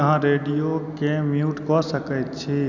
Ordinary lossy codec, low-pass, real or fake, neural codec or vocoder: none; 7.2 kHz; real; none